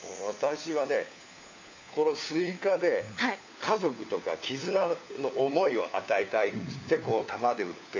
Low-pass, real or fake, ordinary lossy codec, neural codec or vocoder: 7.2 kHz; fake; AAC, 48 kbps; codec, 16 kHz, 4 kbps, FunCodec, trained on LibriTTS, 50 frames a second